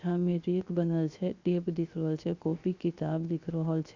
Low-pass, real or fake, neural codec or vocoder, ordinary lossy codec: 7.2 kHz; fake; codec, 16 kHz, 0.7 kbps, FocalCodec; Opus, 64 kbps